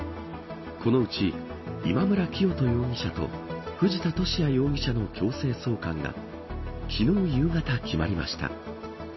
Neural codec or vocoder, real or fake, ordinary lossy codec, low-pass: none; real; MP3, 24 kbps; 7.2 kHz